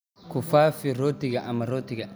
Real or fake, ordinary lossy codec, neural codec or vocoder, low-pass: real; none; none; none